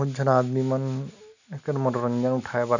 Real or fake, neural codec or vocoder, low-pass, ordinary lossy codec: real; none; 7.2 kHz; none